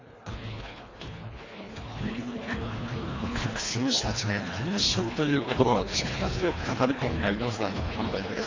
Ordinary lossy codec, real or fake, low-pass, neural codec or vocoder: AAC, 32 kbps; fake; 7.2 kHz; codec, 24 kHz, 1.5 kbps, HILCodec